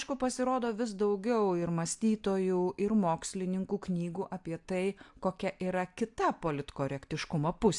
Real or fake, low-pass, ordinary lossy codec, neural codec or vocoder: real; 10.8 kHz; AAC, 64 kbps; none